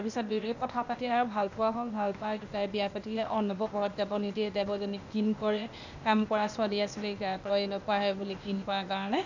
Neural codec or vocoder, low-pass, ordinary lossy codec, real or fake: codec, 16 kHz, 0.8 kbps, ZipCodec; 7.2 kHz; none; fake